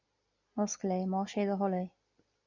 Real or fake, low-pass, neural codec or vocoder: real; 7.2 kHz; none